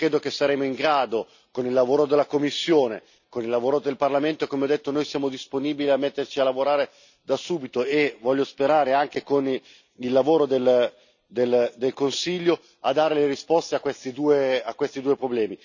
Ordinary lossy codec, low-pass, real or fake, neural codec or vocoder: none; 7.2 kHz; real; none